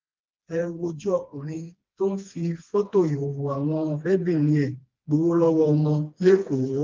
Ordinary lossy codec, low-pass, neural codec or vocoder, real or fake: Opus, 32 kbps; 7.2 kHz; codec, 16 kHz, 2 kbps, FreqCodec, smaller model; fake